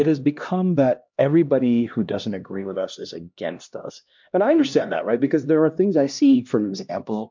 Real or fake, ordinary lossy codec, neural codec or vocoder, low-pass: fake; MP3, 64 kbps; codec, 16 kHz, 1 kbps, X-Codec, HuBERT features, trained on LibriSpeech; 7.2 kHz